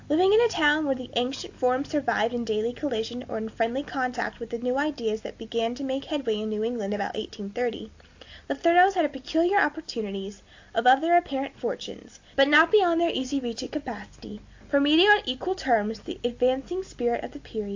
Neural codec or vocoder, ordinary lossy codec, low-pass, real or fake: none; AAC, 48 kbps; 7.2 kHz; real